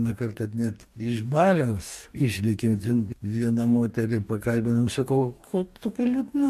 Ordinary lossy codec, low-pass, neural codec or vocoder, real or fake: MP3, 64 kbps; 14.4 kHz; codec, 44.1 kHz, 2.6 kbps, DAC; fake